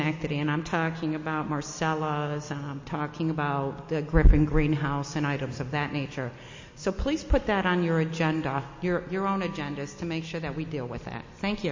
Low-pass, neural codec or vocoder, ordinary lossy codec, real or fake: 7.2 kHz; none; MP3, 32 kbps; real